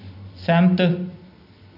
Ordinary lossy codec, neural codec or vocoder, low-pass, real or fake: none; none; 5.4 kHz; real